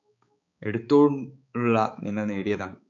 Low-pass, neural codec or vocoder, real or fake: 7.2 kHz; codec, 16 kHz, 4 kbps, X-Codec, HuBERT features, trained on balanced general audio; fake